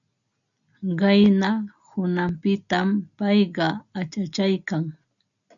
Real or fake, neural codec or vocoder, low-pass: real; none; 7.2 kHz